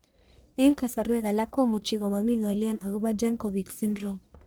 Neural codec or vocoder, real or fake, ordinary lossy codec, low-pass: codec, 44.1 kHz, 1.7 kbps, Pupu-Codec; fake; none; none